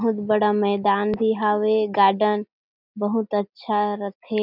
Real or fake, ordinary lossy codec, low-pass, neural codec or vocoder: real; none; 5.4 kHz; none